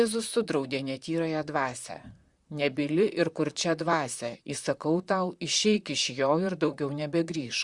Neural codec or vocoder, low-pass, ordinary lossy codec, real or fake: vocoder, 44.1 kHz, 128 mel bands, Pupu-Vocoder; 10.8 kHz; Opus, 64 kbps; fake